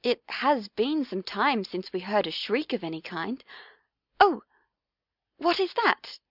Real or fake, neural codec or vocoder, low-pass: real; none; 5.4 kHz